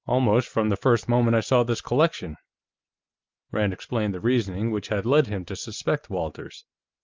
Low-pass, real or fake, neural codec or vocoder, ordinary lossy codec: 7.2 kHz; real; none; Opus, 24 kbps